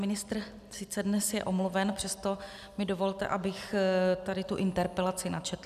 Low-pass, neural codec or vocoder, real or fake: 14.4 kHz; none; real